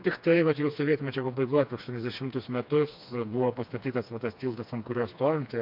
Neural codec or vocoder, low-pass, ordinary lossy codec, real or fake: codec, 16 kHz, 2 kbps, FreqCodec, smaller model; 5.4 kHz; MP3, 48 kbps; fake